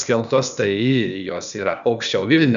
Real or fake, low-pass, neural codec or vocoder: fake; 7.2 kHz; codec, 16 kHz, 0.8 kbps, ZipCodec